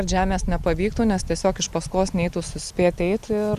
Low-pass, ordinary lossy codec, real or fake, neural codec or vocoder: 14.4 kHz; Opus, 64 kbps; real; none